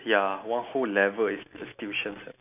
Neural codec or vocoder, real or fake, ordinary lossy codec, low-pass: none; real; none; 3.6 kHz